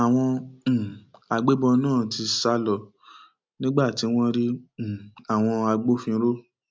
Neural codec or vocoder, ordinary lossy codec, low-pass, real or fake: none; none; none; real